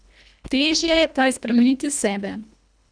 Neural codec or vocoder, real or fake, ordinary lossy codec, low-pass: codec, 24 kHz, 1.5 kbps, HILCodec; fake; none; 9.9 kHz